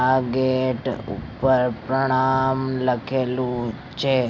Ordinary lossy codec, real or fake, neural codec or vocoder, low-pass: none; real; none; none